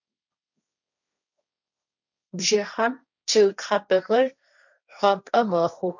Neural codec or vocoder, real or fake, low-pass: codec, 16 kHz, 1.1 kbps, Voila-Tokenizer; fake; 7.2 kHz